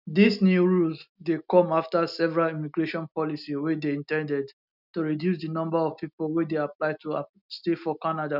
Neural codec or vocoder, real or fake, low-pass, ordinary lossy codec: none; real; 5.4 kHz; none